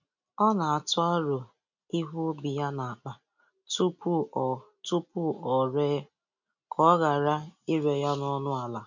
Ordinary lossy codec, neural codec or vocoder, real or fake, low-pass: none; none; real; 7.2 kHz